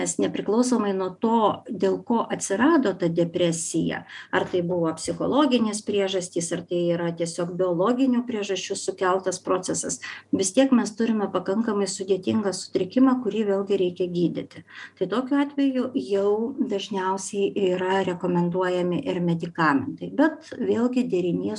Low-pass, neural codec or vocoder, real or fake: 10.8 kHz; none; real